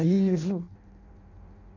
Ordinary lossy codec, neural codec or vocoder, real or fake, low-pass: none; codec, 16 kHz in and 24 kHz out, 0.6 kbps, FireRedTTS-2 codec; fake; 7.2 kHz